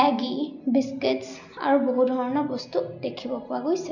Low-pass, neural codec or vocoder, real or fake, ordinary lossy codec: 7.2 kHz; none; real; none